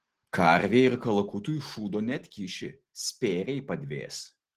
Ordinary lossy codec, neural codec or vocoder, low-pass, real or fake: Opus, 16 kbps; none; 14.4 kHz; real